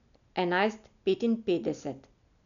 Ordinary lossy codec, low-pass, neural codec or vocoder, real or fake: none; 7.2 kHz; none; real